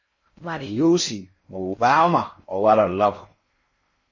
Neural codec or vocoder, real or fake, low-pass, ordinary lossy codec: codec, 16 kHz in and 24 kHz out, 0.6 kbps, FocalCodec, streaming, 4096 codes; fake; 7.2 kHz; MP3, 32 kbps